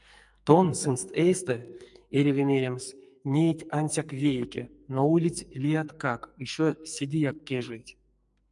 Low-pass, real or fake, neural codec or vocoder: 10.8 kHz; fake; codec, 44.1 kHz, 2.6 kbps, SNAC